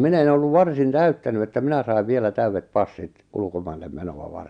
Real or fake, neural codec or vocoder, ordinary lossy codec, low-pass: real; none; Opus, 64 kbps; 9.9 kHz